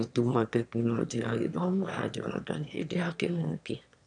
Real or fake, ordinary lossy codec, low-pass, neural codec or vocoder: fake; none; 9.9 kHz; autoencoder, 22.05 kHz, a latent of 192 numbers a frame, VITS, trained on one speaker